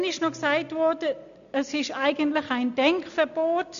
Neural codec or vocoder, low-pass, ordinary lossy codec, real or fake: none; 7.2 kHz; none; real